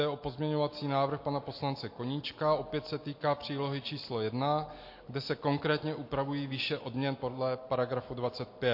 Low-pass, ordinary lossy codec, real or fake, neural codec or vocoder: 5.4 kHz; MP3, 32 kbps; real; none